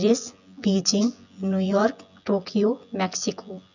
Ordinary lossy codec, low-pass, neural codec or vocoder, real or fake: none; 7.2 kHz; vocoder, 24 kHz, 100 mel bands, Vocos; fake